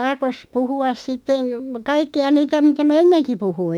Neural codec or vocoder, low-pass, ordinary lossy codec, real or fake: autoencoder, 48 kHz, 32 numbers a frame, DAC-VAE, trained on Japanese speech; 19.8 kHz; none; fake